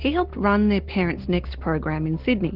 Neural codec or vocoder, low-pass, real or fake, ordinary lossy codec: none; 5.4 kHz; real; Opus, 16 kbps